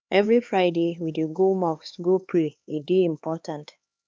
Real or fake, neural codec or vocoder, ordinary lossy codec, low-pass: fake; codec, 16 kHz, 2 kbps, X-Codec, HuBERT features, trained on LibriSpeech; none; none